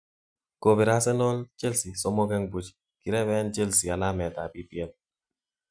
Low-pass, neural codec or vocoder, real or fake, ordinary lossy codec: 9.9 kHz; none; real; none